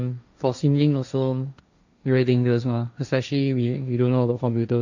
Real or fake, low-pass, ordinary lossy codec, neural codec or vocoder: fake; 7.2 kHz; none; codec, 16 kHz, 1.1 kbps, Voila-Tokenizer